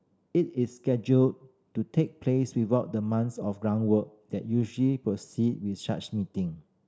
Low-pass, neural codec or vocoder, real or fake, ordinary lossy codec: none; none; real; none